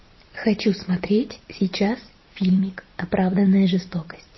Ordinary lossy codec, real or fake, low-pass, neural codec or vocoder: MP3, 24 kbps; fake; 7.2 kHz; vocoder, 44.1 kHz, 128 mel bands every 256 samples, BigVGAN v2